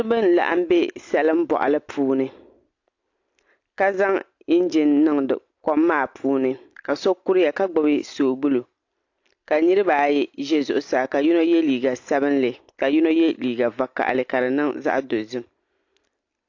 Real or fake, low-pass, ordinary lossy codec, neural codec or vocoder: real; 7.2 kHz; AAC, 48 kbps; none